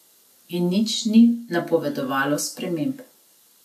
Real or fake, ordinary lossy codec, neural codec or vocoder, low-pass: real; none; none; 14.4 kHz